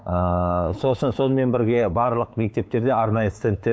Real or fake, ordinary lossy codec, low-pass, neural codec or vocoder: fake; none; none; codec, 16 kHz, 4 kbps, X-Codec, WavLM features, trained on Multilingual LibriSpeech